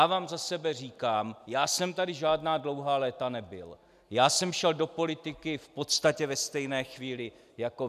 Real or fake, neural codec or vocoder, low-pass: real; none; 14.4 kHz